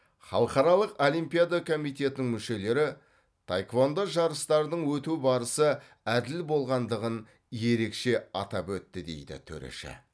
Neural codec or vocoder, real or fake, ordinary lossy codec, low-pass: none; real; none; none